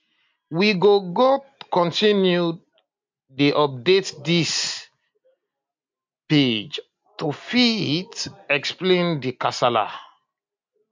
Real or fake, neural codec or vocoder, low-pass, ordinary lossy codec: real; none; 7.2 kHz; MP3, 64 kbps